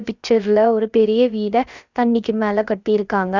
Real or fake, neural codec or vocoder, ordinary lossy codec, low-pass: fake; codec, 16 kHz, about 1 kbps, DyCAST, with the encoder's durations; Opus, 64 kbps; 7.2 kHz